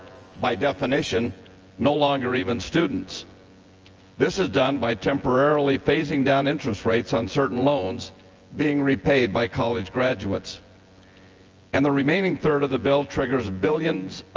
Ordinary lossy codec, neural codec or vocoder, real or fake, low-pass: Opus, 24 kbps; vocoder, 24 kHz, 100 mel bands, Vocos; fake; 7.2 kHz